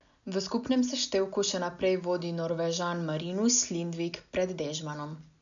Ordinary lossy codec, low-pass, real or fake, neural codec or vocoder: none; 7.2 kHz; real; none